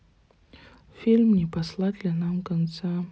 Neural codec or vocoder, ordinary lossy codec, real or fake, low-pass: none; none; real; none